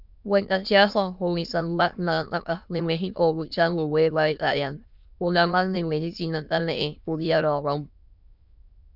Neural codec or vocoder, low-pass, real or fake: autoencoder, 22.05 kHz, a latent of 192 numbers a frame, VITS, trained on many speakers; 5.4 kHz; fake